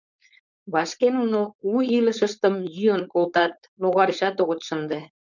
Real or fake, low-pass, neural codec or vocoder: fake; 7.2 kHz; codec, 16 kHz, 4.8 kbps, FACodec